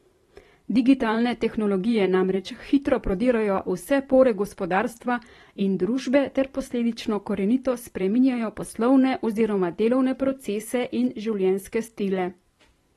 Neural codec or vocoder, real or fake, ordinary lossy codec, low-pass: vocoder, 44.1 kHz, 128 mel bands every 512 samples, BigVGAN v2; fake; AAC, 32 kbps; 19.8 kHz